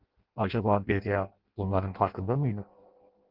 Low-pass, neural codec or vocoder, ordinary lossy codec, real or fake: 5.4 kHz; codec, 16 kHz in and 24 kHz out, 0.6 kbps, FireRedTTS-2 codec; Opus, 16 kbps; fake